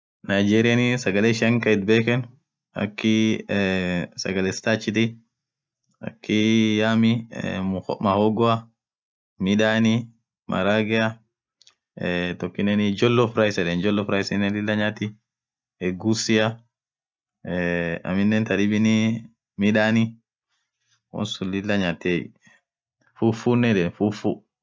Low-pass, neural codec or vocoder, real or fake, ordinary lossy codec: none; none; real; none